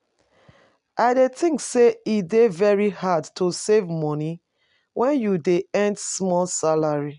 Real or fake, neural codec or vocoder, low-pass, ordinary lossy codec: real; none; 10.8 kHz; none